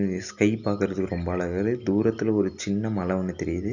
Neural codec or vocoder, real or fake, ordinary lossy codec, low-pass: none; real; none; 7.2 kHz